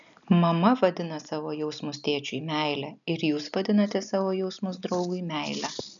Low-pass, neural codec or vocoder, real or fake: 7.2 kHz; none; real